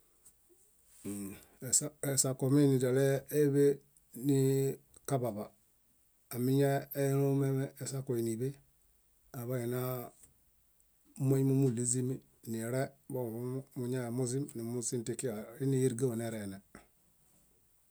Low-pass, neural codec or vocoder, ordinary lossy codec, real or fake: none; none; none; real